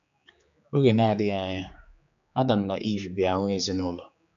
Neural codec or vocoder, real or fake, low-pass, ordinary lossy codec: codec, 16 kHz, 4 kbps, X-Codec, HuBERT features, trained on general audio; fake; 7.2 kHz; none